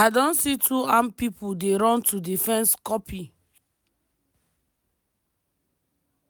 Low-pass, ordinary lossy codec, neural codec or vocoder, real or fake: none; none; none; real